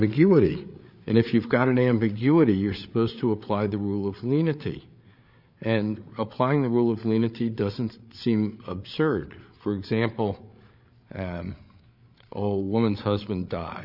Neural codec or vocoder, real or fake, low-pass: codec, 16 kHz, 8 kbps, FreqCodec, larger model; fake; 5.4 kHz